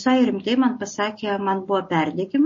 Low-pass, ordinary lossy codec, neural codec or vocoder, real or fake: 7.2 kHz; MP3, 32 kbps; none; real